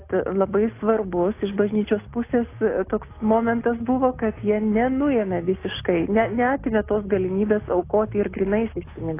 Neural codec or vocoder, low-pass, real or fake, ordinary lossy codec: none; 3.6 kHz; real; AAC, 24 kbps